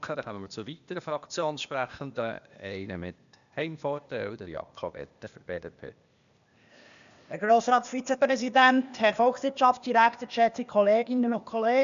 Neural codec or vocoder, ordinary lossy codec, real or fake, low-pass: codec, 16 kHz, 0.8 kbps, ZipCodec; none; fake; 7.2 kHz